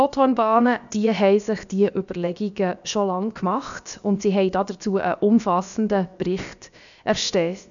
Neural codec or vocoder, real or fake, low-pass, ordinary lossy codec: codec, 16 kHz, about 1 kbps, DyCAST, with the encoder's durations; fake; 7.2 kHz; none